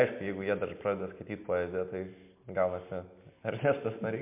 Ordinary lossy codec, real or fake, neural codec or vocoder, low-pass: MP3, 32 kbps; real; none; 3.6 kHz